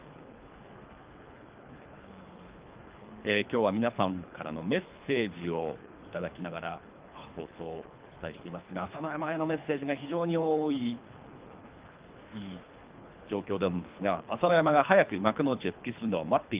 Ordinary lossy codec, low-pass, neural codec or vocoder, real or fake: Opus, 32 kbps; 3.6 kHz; codec, 24 kHz, 3 kbps, HILCodec; fake